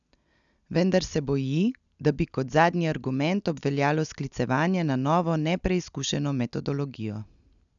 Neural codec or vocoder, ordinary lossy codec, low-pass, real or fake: none; none; 7.2 kHz; real